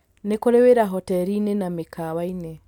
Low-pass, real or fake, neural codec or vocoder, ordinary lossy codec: 19.8 kHz; real; none; none